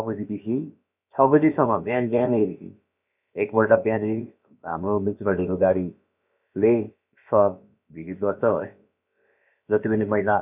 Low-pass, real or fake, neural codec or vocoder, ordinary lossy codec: 3.6 kHz; fake; codec, 16 kHz, about 1 kbps, DyCAST, with the encoder's durations; none